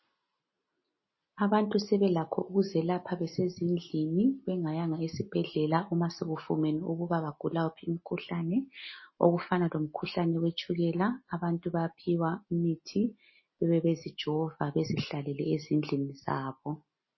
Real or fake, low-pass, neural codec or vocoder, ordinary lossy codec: real; 7.2 kHz; none; MP3, 24 kbps